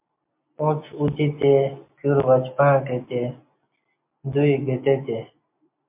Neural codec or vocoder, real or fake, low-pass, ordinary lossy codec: none; real; 3.6 kHz; MP3, 32 kbps